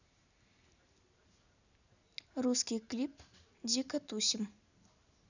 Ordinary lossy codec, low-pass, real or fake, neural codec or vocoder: none; 7.2 kHz; real; none